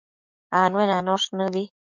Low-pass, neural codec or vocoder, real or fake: 7.2 kHz; codec, 44.1 kHz, 7.8 kbps, DAC; fake